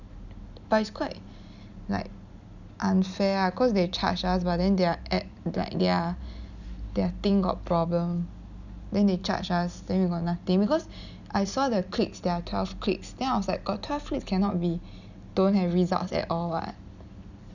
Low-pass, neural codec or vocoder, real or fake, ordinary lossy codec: 7.2 kHz; none; real; none